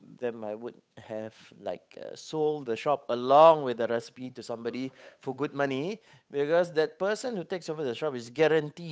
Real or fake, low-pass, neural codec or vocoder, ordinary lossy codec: fake; none; codec, 16 kHz, 8 kbps, FunCodec, trained on Chinese and English, 25 frames a second; none